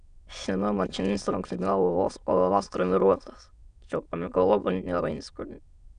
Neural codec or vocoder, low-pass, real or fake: autoencoder, 22.05 kHz, a latent of 192 numbers a frame, VITS, trained on many speakers; 9.9 kHz; fake